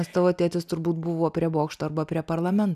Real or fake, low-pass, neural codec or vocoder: real; 14.4 kHz; none